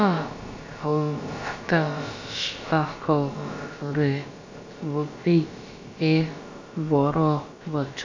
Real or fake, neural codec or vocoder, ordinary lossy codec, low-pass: fake; codec, 16 kHz, about 1 kbps, DyCAST, with the encoder's durations; MP3, 64 kbps; 7.2 kHz